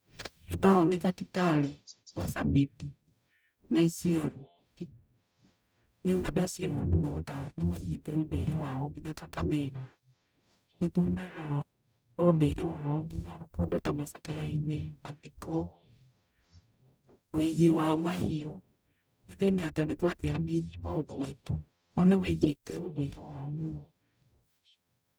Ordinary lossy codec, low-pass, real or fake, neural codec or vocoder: none; none; fake; codec, 44.1 kHz, 0.9 kbps, DAC